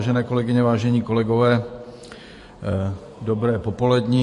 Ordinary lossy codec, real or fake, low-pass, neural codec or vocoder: MP3, 48 kbps; fake; 14.4 kHz; vocoder, 48 kHz, 128 mel bands, Vocos